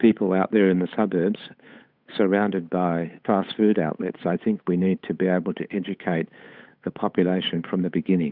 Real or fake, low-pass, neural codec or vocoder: fake; 5.4 kHz; codec, 16 kHz, 8 kbps, FunCodec, trained on Chinese and English, 25 frames a second